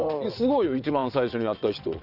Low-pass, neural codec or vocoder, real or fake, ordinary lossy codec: 5.4 kHz; vocoder, 22.05 kHz, 80 mel bands, WaveNeXt; fake; none